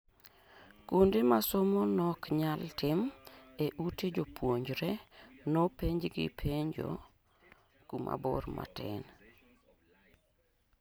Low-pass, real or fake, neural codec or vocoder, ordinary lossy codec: none; real; none; none